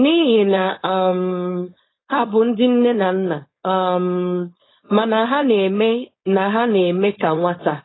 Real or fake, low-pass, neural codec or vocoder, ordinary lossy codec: fake; 7.2 kHz; codec, 16 kHz, 4.8 kbps, FACodec; AAC, 16 kbps